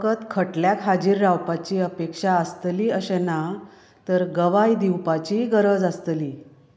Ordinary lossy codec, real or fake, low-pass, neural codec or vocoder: none; real; none; none